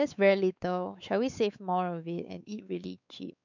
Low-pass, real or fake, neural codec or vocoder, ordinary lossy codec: 7.2 kHz; fake; codec, 16 kHz, 4 kbps, X-Codec, WavLM features, trained on Multilingual LibriSpeech; none